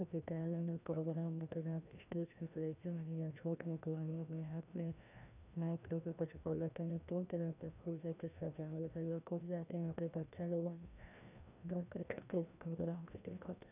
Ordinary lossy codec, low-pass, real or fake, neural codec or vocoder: none; 3.6 kHz; fake; codec, 16 kHz, 1 kbps, FreqCodec, larger model